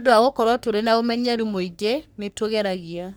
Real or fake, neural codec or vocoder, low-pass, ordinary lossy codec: fake; codec, 44.1 kHz, 3.4 kbps, Pupu-Codec; none; none